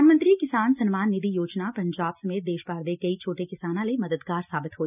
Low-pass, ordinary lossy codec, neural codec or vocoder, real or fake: 3.6 kHz; none; none; real